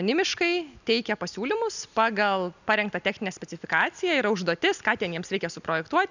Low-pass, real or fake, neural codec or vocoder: 7.2 kHz; real; none